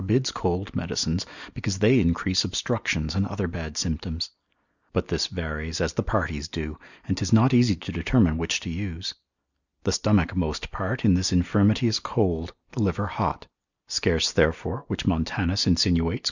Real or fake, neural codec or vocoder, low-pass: real; none; 7.2 kHz